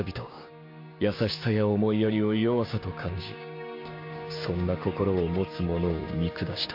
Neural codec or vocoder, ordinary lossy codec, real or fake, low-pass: codec, 16 kHz, 6 kbps, DAC; MP3, 32 kbps; fake; 5.4 kHz